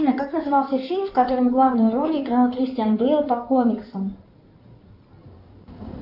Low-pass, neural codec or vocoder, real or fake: 5.4 kHz; codec, 16 kHz in and 24 kHz out, 2.2 kbps, FireRedTTS-2 codec; fake